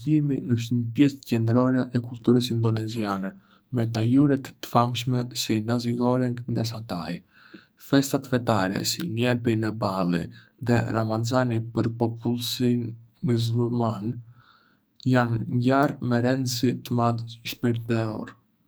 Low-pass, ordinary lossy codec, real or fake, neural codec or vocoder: none; none; fake; codec, 44.1 kHz, 2.6 kbps, SNAC